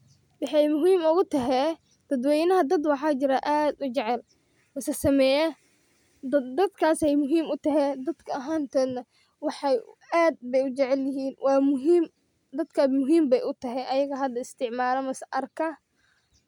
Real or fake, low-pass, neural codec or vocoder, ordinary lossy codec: real; 19.8 kHz; none; none